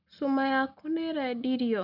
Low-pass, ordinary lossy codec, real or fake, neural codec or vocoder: 5.4 kHz; none; real; none